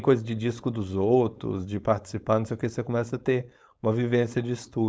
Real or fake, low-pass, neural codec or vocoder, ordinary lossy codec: fake; none; codec, 16 kHz, 4.8 kbps, FACodec; none